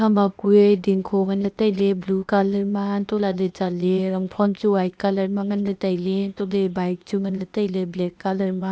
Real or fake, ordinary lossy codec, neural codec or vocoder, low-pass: fake; none; codec, 16 kHz, 0.8 kbps, ZipCodec; none